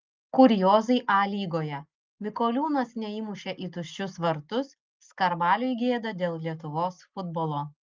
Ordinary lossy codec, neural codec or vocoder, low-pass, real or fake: Opus, 32 kbps; none; 7.2 kHz; real